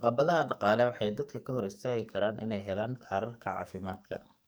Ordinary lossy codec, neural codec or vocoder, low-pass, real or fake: none; codec, 44.1 kHz, 2.6 kbps, SNAC; none; fake